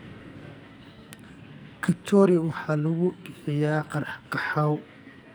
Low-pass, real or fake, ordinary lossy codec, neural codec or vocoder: none; fake; none; codec, 44.1 kHz, 2.6 kbps, SNAC